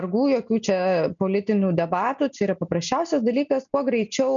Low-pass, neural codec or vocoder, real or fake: 7.2 kHz; none; real